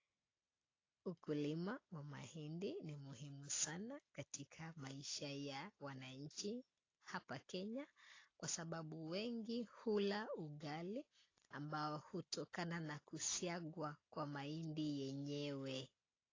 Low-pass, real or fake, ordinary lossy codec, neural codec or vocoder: 7.2 kHz; real; AAC, 32 kbps; none